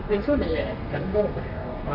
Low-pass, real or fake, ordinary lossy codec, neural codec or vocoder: 5.4 kHz; fake; Opus, 64 kbps; codec, 32 kHz, 1.9 kbps, SNAC